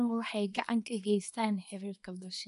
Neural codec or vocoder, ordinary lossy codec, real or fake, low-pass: codec, 24 kHz, 0.9 kbps, WavTokenizer, small release; none; fake; 10.8 kHz